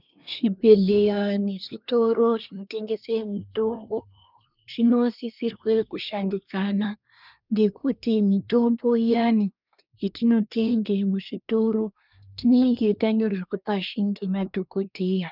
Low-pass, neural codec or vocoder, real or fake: 5.4 kHz; codec, 24 kHz, 1 kbps, SNAC; fake